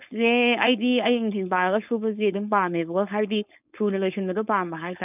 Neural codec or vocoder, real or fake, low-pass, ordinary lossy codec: codec, 16 kHz, 4.8 kbps, FACodec; fake; 3.6 kHz; none